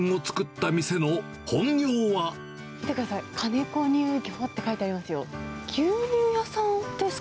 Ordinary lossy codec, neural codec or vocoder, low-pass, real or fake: none; none; none; real